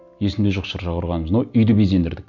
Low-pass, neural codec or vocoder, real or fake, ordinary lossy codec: 7.2 kHz; none; real; none